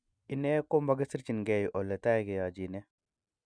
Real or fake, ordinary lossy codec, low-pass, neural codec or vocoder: real; none; 9.9 kHz; none